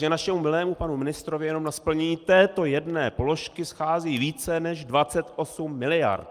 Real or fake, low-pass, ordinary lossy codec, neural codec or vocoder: real; 14.4 kHz; Opus, 32 kbps; none